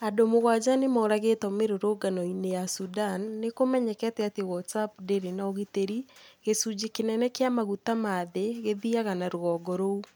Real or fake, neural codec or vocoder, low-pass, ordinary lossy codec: real; none; none; none